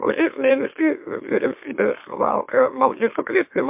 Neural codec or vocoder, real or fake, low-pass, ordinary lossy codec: autoencoder, 44.1 kHz, a latent of 192 numbers a frame, MeloTTS; fake; 3.6 kHz; MP3, 32 kbps